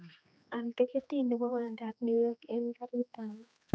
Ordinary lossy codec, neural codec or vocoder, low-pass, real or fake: none; codec, 16 kHz, 2 kbps, X-Codec, HuBERT features, trained on general audio; none; fake